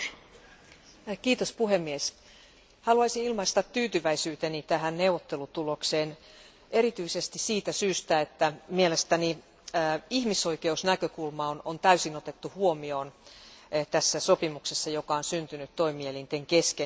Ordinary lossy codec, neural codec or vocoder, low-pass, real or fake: none; none; none; real